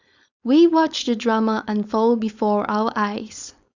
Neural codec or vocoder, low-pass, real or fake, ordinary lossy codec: codec, 16 kHz, 4.8 kbps, FACodec; 7.2 kHz; fake; Opus, 64 kbps